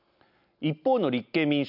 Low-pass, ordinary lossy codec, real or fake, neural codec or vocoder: 5.4 kHz; none; real; none